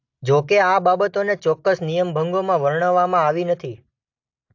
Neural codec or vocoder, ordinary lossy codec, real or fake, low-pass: none; AAC, 48 kbps; real; 7.2 kHz